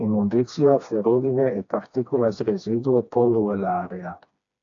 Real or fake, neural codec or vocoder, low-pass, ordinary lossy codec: fake; codec, 16 kHz, 2 kbps, FreqCodec, smaller model; 7.2 kHz; AAC, 48 kbps